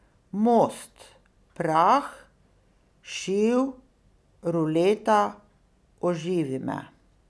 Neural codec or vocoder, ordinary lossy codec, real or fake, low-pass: none; none; real; none